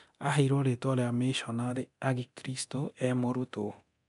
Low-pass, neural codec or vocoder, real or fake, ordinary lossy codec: 10.8 kHz; codec, 24 kHz, 0.9 kbps, DualCodec; fake; none